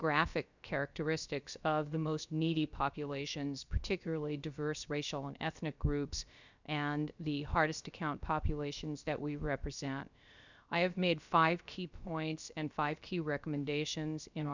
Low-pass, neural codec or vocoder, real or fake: 7.2 kHz; codec, 16 kHz, about 1 kbps, DyCAST, with the encoder's durations; fake